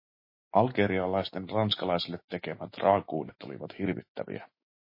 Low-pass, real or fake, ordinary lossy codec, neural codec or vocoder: 5.4 kHz; fake; MP3, 24 kbps; autoencoder, 48 kHz, 128 numbers a frame, DAC-VAE, trained on Japanese speech